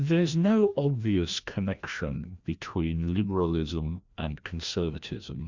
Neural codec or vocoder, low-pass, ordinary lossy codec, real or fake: codec, 16 kHz, 1 kbps, FreqCodec, larger model; 7.2 kHz; Opus, 64 kbps; fake